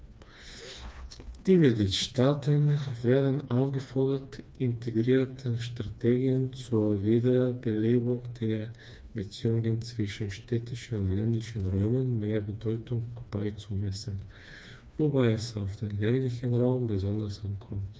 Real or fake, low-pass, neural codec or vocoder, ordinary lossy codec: fake; none; codec, 16 kHz, 2 kbps, FreqCodec, smaller model; none